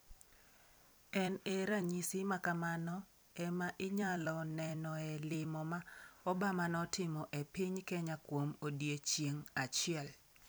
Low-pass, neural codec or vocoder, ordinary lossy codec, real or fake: none; vocoder, 44.1 kHz, 128 mel bands every 256 samples, BigVGAN v2; none; fake